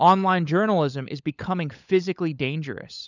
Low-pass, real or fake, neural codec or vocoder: 7.2 kHz; fake; codec, 16 kHz, 16 kbps, FunCodec, trained on LibriTTS, 50 frames a second